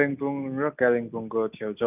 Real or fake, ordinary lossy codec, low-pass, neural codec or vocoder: real; none; 3.6 kHz; none